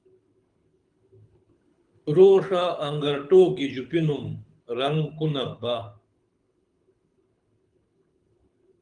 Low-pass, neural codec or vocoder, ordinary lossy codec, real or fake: 9.9 kHz; codec, 24 kHz, 6 kbps, HILCodec; Opus, 32 kbps; fake